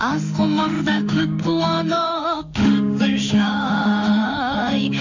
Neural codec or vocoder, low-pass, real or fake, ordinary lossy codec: autoencoder, 48 kHz, 32 numbers a frame, DAC-VAE, trained on Japanese speech; 7.2 kHz; fake; none